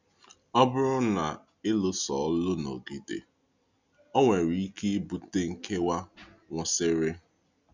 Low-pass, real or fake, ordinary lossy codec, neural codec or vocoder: 7.2 kHz; real; none; none